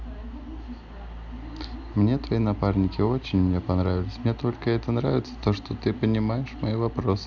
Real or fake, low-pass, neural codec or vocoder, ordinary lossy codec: real; 7.2 kHz; none; none